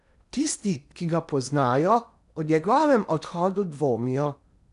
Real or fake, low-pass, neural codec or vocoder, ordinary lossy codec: fake; 10.8 kHz; codec, 16 kHz in and 24 kHz out, 0.8 kbps, FocalCodec, streaming, 65536 codes; none